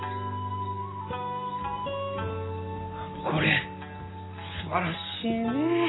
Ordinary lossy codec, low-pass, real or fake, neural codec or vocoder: AAC, 16 kbps; 7.2 kHz; real; none